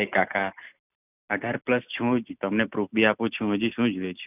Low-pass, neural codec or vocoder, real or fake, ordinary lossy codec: 3.6 kHz; none; real; none